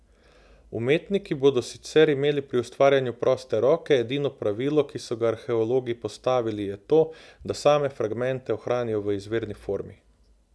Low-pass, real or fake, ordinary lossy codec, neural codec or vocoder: none; real; none; none